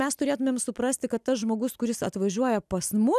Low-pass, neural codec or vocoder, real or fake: 14.4 kHz; none; real